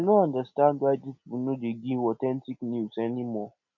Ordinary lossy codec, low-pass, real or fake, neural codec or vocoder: none; 7.2 kHz; real; none